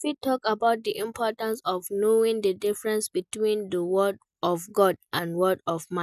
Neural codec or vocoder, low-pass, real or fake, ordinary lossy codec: none; 14.4 kHz; real; none